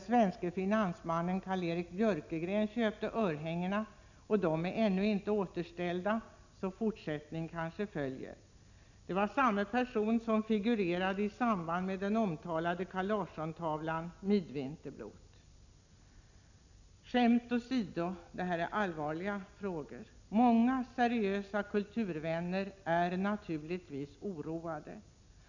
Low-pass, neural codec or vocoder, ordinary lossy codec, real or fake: 7.2 kHz; none; none; real